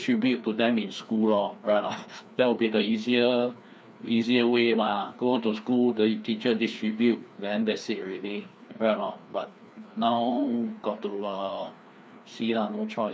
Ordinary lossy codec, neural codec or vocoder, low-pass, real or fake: none; codec, 16 kHz, 2 kbps, FreqCodec, larger model; none; fake